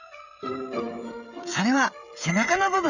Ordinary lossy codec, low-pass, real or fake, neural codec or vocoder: none; 7.2 kHz; fake; codec, 16 kHz, 16 kbps, FreqCodec, smaller model